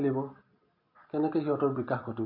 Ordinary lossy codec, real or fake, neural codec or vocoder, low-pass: MP3, 32 kbps; real; none; 5.4 kHz